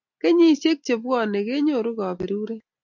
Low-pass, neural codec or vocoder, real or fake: 7.2 kHz; none; real